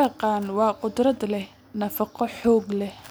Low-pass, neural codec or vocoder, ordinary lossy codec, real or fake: none; none; none; real